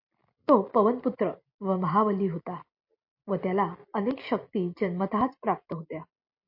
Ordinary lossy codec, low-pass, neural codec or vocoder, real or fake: MP3, 32 kbps; 5.4 kHz; none; real